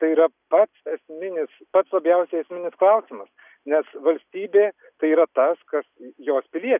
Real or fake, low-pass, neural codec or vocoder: real; 3.6 kHz; none